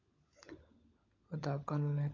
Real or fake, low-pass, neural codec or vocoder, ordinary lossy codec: fake; 7.2 kHz; codec, 16 kHz, 16 kbps, FunCodec, trained on LibriTTS, 50 frames a second; MP3, 48 kbps